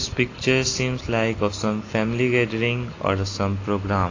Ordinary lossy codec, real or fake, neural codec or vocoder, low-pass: AAC, 32 kbps; real; none; 7.2 kHz